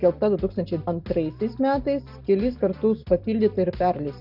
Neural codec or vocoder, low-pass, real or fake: none; 5.4 kHz; real